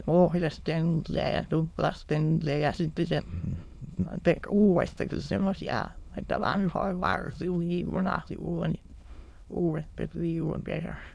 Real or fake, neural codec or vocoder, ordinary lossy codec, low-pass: fake; autoencoder, 22.05 kHz, a latent of 192 numbers a frame, VITS, trained on many speakers; none; none